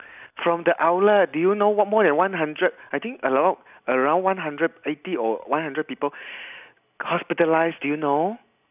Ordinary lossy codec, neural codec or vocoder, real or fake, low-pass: none; none; real; 3.6 kHz